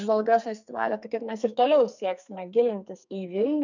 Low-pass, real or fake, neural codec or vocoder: 7.2 kHz; fake; codec, 44.1 kHz, 2.6 kbps, SNAC